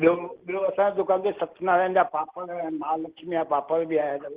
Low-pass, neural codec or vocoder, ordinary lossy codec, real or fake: 3.6 kHz; none; Opus, 16 kbps; real